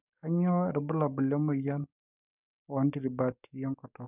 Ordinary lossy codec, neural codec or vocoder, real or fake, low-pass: none; codec, 44.1 kHz, 7.8 kbps, DAC; fake; 3.6 kHz